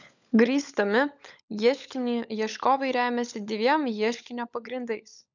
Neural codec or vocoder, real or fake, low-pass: codec, 16 kHz, 16 kbps, FunCodec, trained on LibriTTS, 50 frames a second; fake; 7.2 kHz